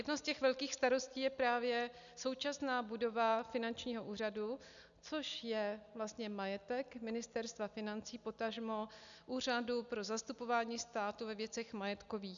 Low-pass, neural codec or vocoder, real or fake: 7.2 kHz; none; real